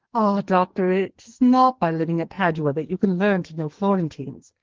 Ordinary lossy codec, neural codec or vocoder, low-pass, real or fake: Opus, 16 kbps; codec, 44.1 kHz, 2.6 kbps, SNAC; 7.2 kHz; fake